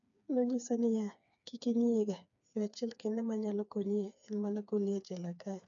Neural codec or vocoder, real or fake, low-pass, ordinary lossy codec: codec, 16 kHz, 4 kbps, FreqCodec, smaller model; fake; 7.2 kHz; none